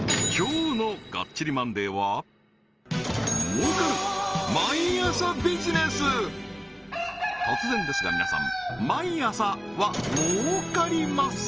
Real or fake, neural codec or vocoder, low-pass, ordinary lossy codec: real; none; 7.2 kHz; Opus, 24 kbps